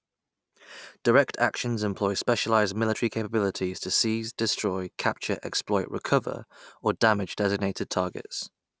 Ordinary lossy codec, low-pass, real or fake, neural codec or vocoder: none; none; real; none